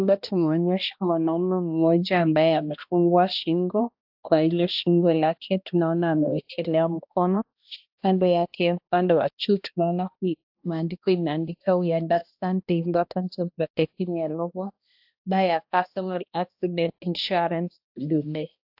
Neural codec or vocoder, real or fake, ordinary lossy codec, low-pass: codec, 16 kHz, 1 kbps, X-Codec, HuBERT features, trained on balanced general audio; fake; AAC, 48 kbps; 5.4 kHz